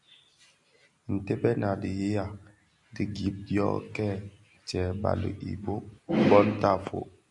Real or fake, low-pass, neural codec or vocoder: real; 10.8 kHz; none